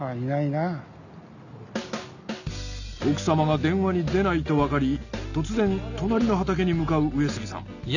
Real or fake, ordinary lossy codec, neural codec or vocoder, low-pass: real; none; none; 7.2 kHz